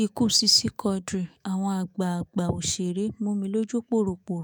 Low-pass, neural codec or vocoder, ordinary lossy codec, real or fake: none; autoencoder, 48 kHz, 128 numbers a frame, DAC-VAE, trained on Japanese speech; none; fake